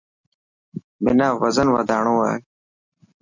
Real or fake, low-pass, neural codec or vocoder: real; 7.2 kHz; none